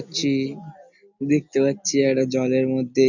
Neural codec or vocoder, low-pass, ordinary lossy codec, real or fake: none; 7.2 kHz; none; real